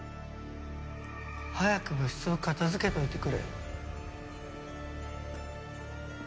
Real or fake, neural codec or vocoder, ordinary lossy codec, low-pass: real; none; none; none